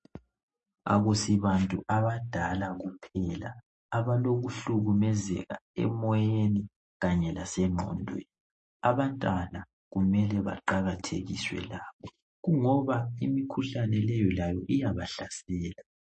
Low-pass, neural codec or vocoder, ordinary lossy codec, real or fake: 10.8 kHz; none; MP3, 32 kbps; real